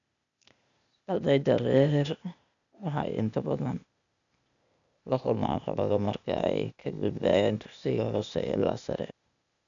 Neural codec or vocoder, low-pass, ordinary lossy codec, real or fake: codec, 16 kHz, 0.8 kbps, ZipCodec; 7.2 kHz; none; fake